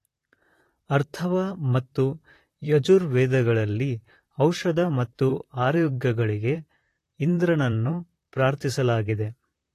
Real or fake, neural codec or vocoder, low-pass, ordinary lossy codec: fake; vocoder, 44.1 kHz, 128 mel bands, Pupu-Vocoder; 14.4 kHz; AAC, 48 kbps